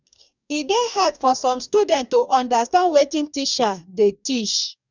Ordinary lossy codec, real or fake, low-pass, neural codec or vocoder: none; fake; 7.2 kHz; codec, 44.1 kHz, 2.6 kbps, DAC